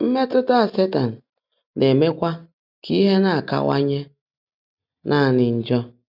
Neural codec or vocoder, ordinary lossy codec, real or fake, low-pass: none; none; real; 5.4 kHz